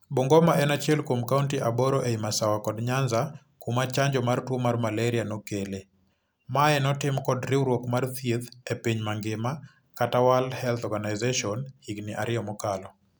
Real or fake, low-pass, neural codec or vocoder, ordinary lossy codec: real; none; none; none